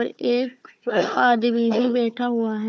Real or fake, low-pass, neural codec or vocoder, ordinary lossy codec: fake; none; codec, 16 kHz, 4 kbps, FunCodec, trained on Chinese and English, 50 frames a second; none